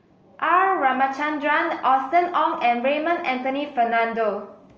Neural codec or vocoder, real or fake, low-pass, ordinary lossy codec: none; real; 7.2 kHz; Opus, 24 kbps